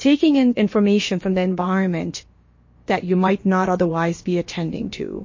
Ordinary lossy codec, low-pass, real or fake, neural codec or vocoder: MP3, 32 kbps; 7.2 kHz; fake; codec, 16 kHz, about 1 kbps, DyCAST, with the encoder's durations